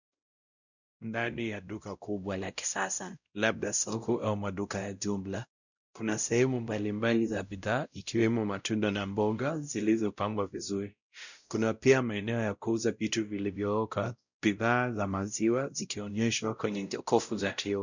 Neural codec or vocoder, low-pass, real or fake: codec, 16 kHz, 0.5 kbps, X-Codec, WavLM features, trained on Multilingual LibriSpeech; 7.2 kHz; fake